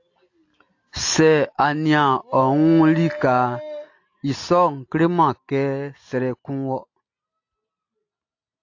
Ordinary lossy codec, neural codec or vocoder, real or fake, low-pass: AAC, 48 kbps; none; real; 7.2 kHz